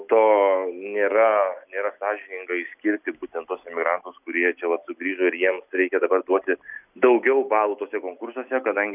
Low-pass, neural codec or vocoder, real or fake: 3.6 kHz; none; real